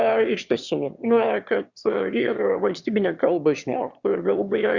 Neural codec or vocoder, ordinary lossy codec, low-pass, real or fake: autoencoder, 22.05 kHz, a latent of 192 numbers a frame, VITS, trained on one speaker; Opus, 64 kbps; 7.2 kHz; fake